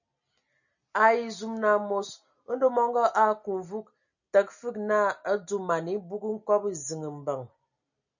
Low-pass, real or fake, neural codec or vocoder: 7.2 kHz; real; none